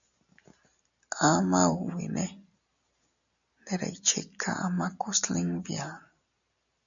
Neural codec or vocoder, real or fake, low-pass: none; real; 7.2 kHz